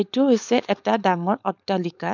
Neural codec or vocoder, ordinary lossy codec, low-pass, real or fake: codec, 16 kHz, 4.8 kbps, FACodec; none; 7.2 kHz; fake